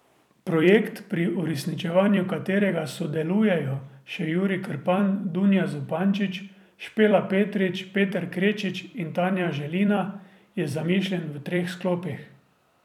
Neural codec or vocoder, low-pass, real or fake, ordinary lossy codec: vocoder, 44.1 kHz, 128 mel bands every 256 samples, BigVGAN v2; 19.8 kHz; fake; none